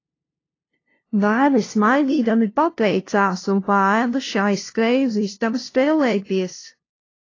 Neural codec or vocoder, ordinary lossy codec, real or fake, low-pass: codec, 16 kHz, 0.5 kbps, FunCodec, trained on LibriTTS, 25 frames a second; AAC, 32 kbps; fake; 7.2 kHz